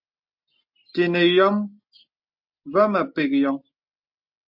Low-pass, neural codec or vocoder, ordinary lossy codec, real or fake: 5.4 kHz; none; MP3, 48 kbps; real